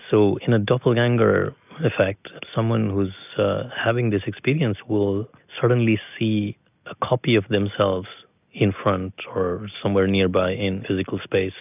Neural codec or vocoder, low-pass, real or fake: none; 3.6 kHz; real